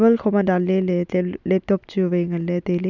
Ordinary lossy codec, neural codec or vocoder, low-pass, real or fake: none; none; 7.2 kHz; real